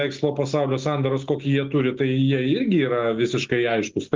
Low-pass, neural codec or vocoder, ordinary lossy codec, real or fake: 7.2 kHz; none; Opus, 32 kbps; real